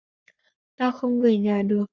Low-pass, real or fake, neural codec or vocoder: 7.2 kHz; fake; codec, 44.1 kHz, 3.4 kbps, Pupu-Codec